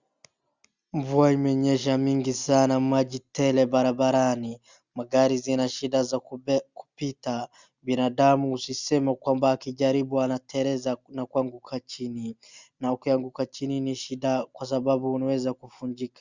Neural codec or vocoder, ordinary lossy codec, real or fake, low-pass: none; Opus, 64 kbps; real; 7.2 kHz